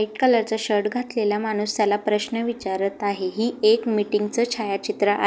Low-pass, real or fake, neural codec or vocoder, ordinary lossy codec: none; real; none; none